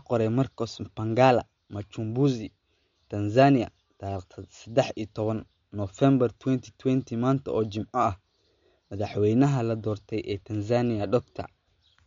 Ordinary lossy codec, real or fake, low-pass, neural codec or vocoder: MP3, 48 kbps; real; 7.2 kHz; none